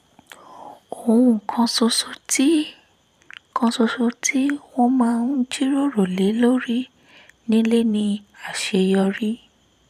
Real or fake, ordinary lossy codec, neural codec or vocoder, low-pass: fake; none; vocoder, 44.1 kHz, 128 mel bands every 512 samples, BigVGAN v2; 14.4 kHz